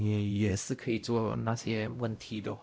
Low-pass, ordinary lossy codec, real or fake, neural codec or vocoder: none; none; fake; codec, 16 kHz, 0.5 kbps, X-Codec, WavLM features, trained on Multilingual LibriSpeech